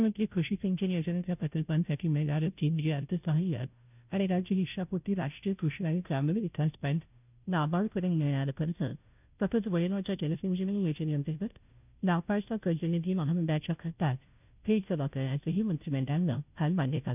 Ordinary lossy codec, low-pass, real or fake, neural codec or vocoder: none; 3.6 kHz; fake; codec, 16 kHz, 0.5 kbps, FunCodec, trained on Chinese and English, 25 frames a second